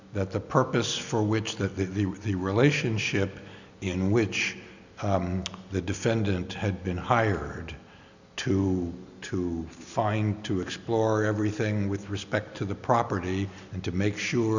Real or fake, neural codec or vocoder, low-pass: real; none; 7.2 kHz